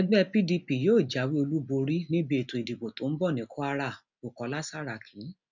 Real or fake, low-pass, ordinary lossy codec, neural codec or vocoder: real; 7.2 kHz; none; none